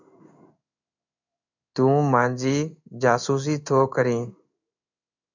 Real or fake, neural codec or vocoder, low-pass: fake; codec, 16 kHz in and 24 kHz out, 1 kbps, XY-Tokenizer; 7.2 kHz